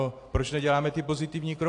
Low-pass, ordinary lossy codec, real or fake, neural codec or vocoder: 10.8 kHz; AAC, 48 kbps; real; none